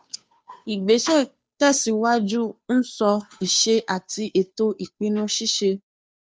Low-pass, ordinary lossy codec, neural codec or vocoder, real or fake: none; none; codec, 16 kHz, 2 kbps, FunCodec, trained on Chinese and English, 25 frames a second; fake